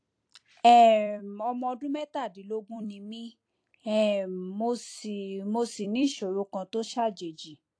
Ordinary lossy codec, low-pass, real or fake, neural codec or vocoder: AAC, 48 kbps; 9.9 kHz; fake; vocoder, 44.1 kHz, 128 mel bands every 256 samples, BigVGAN v2